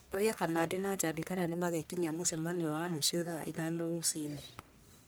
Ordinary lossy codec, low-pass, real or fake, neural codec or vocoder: none; none; fake; codec, 44.1 kHz, 1.7 kbps, Pupu-Codec